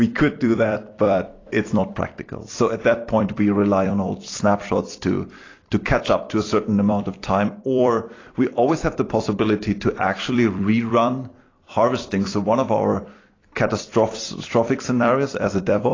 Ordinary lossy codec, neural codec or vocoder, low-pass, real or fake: AAC, 32 kbps; vocoder, 44.1 kHz, 128 mel bands every 256 samples, BigVGAN v2; 7.2 kHz; fake